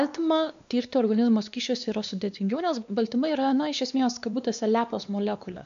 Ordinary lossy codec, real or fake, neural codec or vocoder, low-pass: AAC, 96 kbps; fake; codec, 16 kHz, 2 kbps, X-Codec, WavLM features, trained on Multilingual LibriSpeech; 7.2 kHz